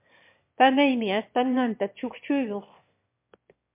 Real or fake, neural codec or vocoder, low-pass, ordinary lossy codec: fake; autoencoder, 22.05 kHz, a latent of 192 numbers a frame, VITS, trained on one speaker; 3.6 kHz; MP3, 32 kbps